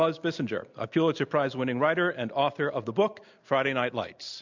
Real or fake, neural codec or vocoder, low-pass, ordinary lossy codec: real; none; 7.2 kHz; MP3, 64 kbps